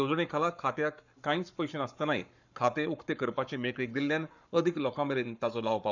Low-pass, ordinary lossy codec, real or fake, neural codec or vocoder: 7.2 kHz; none; fake; codec, 44.1 kHz, 7.8 kbps, Pupu-Codec